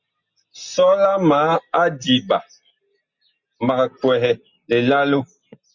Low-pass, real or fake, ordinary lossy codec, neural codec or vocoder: 7.2 kHz; real; Opus, 64 kbps; none